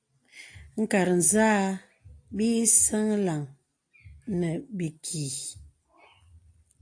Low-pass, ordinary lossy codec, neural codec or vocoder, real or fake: 9.9 kHz; AAC, 48 kbps; none; real